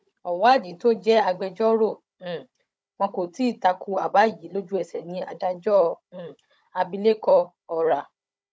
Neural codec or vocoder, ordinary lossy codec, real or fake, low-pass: codec, 16 kHz, 16 kbps, FunCodec, trained on Chinese and English, 50 frames a second; none; fake; none